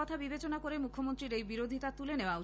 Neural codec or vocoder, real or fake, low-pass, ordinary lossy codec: none; real; none; none